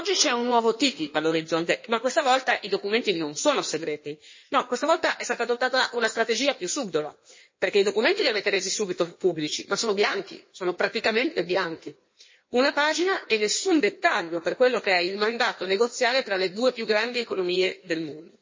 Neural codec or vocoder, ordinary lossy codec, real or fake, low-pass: codec, 16 kHz in and 24 kHz out, 1.1 kbps, FireRedTTS-2 codec; MP3, 32 kbps; fake; 7.2 kHz